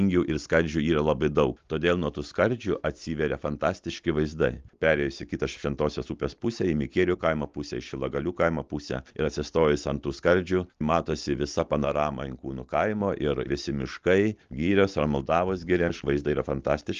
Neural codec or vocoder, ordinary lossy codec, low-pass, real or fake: none; Opus, 32 kbps; 7.2 kHz; real